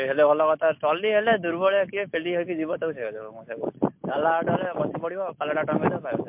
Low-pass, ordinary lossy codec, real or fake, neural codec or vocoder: 3.6 kHz; MP3, 32 kbps; real; none